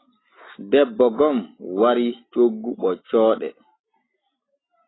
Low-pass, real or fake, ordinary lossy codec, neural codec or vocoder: 7.2 kHz; real; AAC, 16 kbps; none